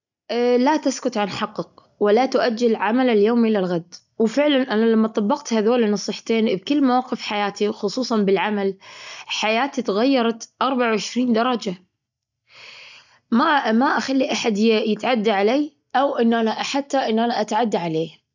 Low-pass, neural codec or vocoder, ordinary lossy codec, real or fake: 7.2 kHz; none; none; real